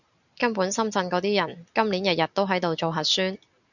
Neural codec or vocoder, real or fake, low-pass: none; real; 7.2 kHz